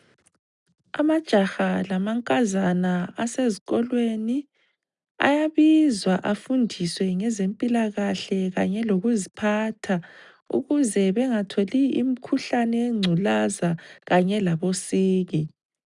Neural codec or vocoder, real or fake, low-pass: none; real; 10.8 kHz